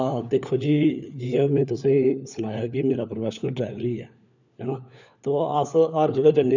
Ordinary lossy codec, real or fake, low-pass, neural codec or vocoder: none; fake; 7.2 kHz; codec, 16 kHz, 4 kbps, FunCodec, trained on LibriTTS, 50 frames a second